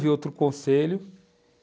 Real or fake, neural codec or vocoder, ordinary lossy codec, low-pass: real; none; none; none